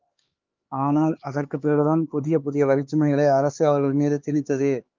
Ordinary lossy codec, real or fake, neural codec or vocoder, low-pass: Opus, 24 kbps; fake; codec, 16 kHz, 2 kbps, X-Codec, HuBERT features, trained on LibriSpeech; 7.2 kHz